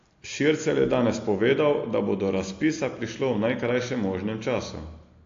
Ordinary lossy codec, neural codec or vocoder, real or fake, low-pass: AAC, 48 kbps; none; real; 7.2 kHz